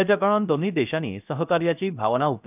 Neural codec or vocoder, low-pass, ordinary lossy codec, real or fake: codec, 16 kHz, 0.3 kbps, FocalCodec; 3.6 kHz; none; fake